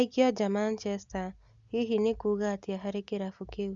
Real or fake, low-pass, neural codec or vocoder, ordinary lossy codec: real; 7.2 kHz; none; Opus, 64 kbps